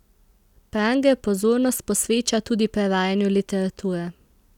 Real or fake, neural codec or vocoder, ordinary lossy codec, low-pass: real; none; none; 19.8 kHz